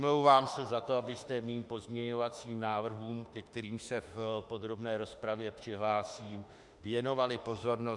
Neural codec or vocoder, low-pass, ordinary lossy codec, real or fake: autoencoder, 48 kHz, 32 numbers a frame, DAC-VAE, trained on Japanese speech; 10.8 kHz; Opus, 64 kbps; fake